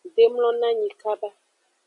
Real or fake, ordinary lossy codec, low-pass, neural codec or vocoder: real; MP3, 96 kbps; 10.8 kHz; none